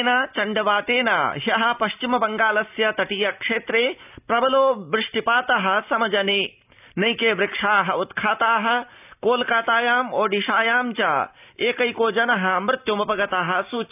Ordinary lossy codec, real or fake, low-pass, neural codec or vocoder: none; real; 3.6 kHz; none